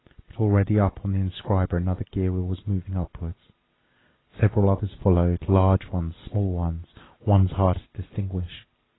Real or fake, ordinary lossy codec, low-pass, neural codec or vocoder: real; AAC, 16 kbps; 7.2 kHz; none